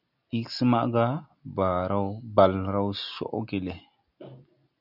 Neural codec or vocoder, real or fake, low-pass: none; real; 5.4 kHz